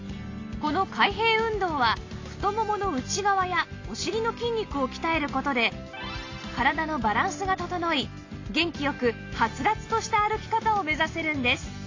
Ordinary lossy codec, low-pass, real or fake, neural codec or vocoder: AAC, 32 kbps; 7.2 kHz; real; none